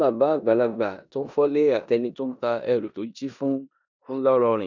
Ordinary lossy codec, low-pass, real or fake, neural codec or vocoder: none; 7.2 kHz; fake; codec, 16 kHz in and 24 kHz out, 0.9 kbps, LongCat-Audio-Codec, four codebook decoder